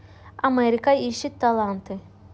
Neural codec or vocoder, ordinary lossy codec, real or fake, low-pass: none; none; real; none